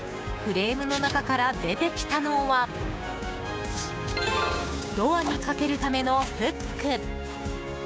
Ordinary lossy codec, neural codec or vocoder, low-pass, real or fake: none; codec, 16 kHz, 6 kbps, DAC; none; fake